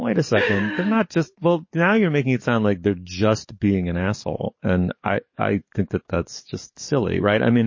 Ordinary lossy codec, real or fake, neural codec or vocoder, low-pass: MP3, 32 kbps; fake; codec, 44.1 kHz, 7.8 kbps, DAC; 7.2 kHz